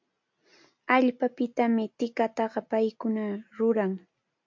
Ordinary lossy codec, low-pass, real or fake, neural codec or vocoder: MP3, 48 kbps; 7.2 kHz; real; none